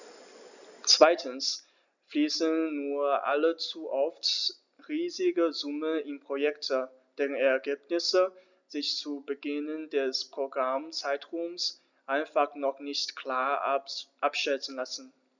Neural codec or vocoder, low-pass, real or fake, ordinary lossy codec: none; 7.2 kHz; real; none